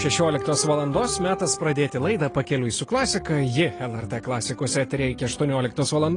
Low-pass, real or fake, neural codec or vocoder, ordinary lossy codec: 9.9 kHz; real; none; AAC, 32 kbps